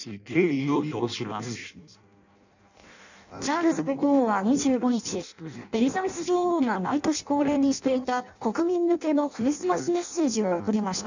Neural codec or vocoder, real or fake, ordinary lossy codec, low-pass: codec, 16 kHz in and 24 kHz out, 0.6 kbps, FireRedTTS-2 codec; fake; none; 7.2 kHz